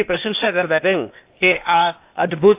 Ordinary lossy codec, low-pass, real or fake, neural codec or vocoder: AAC, 24 kbps; 3.6 kHz; fake; codec, 16 kHz, 0.8 kbps, ZipCodec